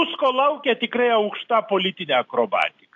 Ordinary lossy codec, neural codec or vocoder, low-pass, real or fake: AAC, 64 kbps; none; 7.2 kHz; real